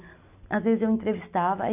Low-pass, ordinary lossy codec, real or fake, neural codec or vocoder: 3.6 kHz; none; real; none